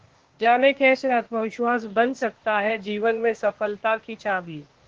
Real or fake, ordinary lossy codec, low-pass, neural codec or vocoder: fake; Opus, 16 kbps; 7.2 kHz; codec, 16 kHz, 0.8 kbps, ZipCodec